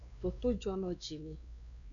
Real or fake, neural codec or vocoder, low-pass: fake; codec, 16 kHz, 2 kbps, X-Codec, WavLM features, trained on Multilingual LibriSpeech; 7.2 kHz